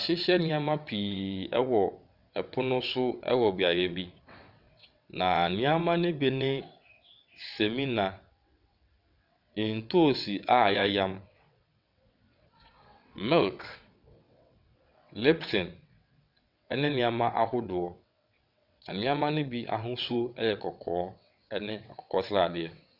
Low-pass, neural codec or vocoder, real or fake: 5.4 kHz; vocoder, 22.05 kHz, 80 mel bands, WaveNeXt; fake